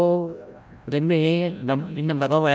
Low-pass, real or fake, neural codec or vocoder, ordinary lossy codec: none; fake; codec, 16 kHz, 0.5 kbps, FreqCodec, larger model; none